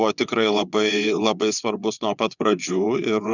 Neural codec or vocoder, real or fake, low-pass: vocoder, 22.05 kHz, 80 mel bands, WaveNeXt; fake; 7.2 kHz